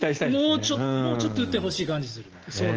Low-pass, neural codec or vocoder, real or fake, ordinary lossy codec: 7.2 kHz; none; real; Opus, 16 kbps